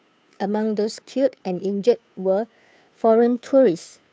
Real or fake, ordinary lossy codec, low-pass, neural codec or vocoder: fake; none; none; codec, 16 kHz, 2 kbps, FunCodec, trained on Chinese and English, 25 frames a second